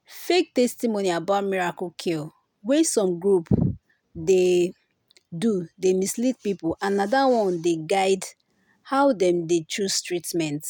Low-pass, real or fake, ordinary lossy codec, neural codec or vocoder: 19.8 kHz; real; none; none